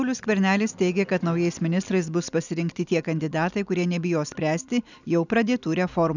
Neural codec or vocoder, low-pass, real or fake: none; 7.2 kHz; real